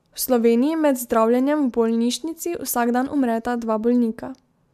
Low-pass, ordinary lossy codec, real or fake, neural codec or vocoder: 14.4 kHz; MP3, 96 kbps; real; none